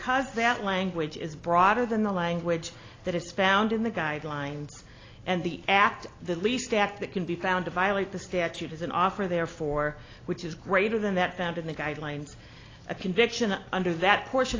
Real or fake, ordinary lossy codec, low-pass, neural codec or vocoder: real; AAC, 32 kbps; 7.2 kHz; none